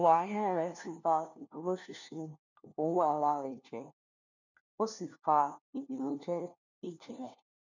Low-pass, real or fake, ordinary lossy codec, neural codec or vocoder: 7.2 kHz; fake; none; codec, 16 kHz, 1 kbps, FunCodec, trained on LibriTTS, 50 frames a second